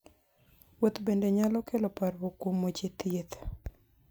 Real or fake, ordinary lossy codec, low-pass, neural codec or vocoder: real; none; none; none